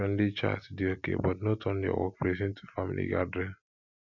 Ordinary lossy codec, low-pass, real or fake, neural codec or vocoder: none; 7.2 kHz; real; none